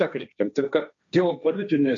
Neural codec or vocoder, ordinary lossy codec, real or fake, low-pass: codec, 16 kHz, 1.1 kbps, Voila-Tokenizer; MP3, 64 kbps; fake; 7.2 kHz